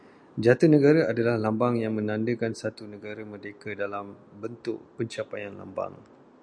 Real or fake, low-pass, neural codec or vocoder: real; 9.9 kHz; none